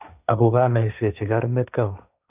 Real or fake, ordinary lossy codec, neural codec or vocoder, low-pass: fake; none; codec, 16 kHz, 1.1 kbps, Voila-Tokenizer; 3.6 kHz